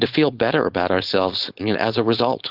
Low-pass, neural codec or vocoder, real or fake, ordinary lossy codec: 5.4 kHz; codec, 16 kHz, 4.8 kbps, FACodec; fake; Opus, 32 kbps